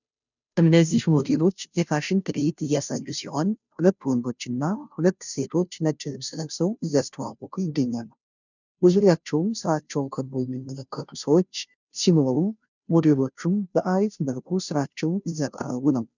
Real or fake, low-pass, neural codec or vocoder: fake; 7.2 kHz; codec, 16 kHz, 0.5 kbps, FunCodec, trained on Chinese and English, 25 frames a second